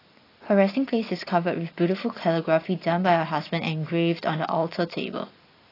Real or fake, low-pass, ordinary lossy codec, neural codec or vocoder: real; 5.4 kHz; AAC, 24 kbps; none